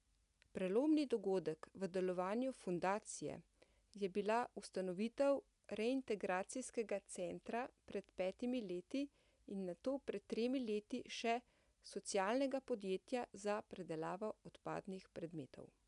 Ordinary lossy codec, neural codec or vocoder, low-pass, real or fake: none; none; 10.8 kHz; real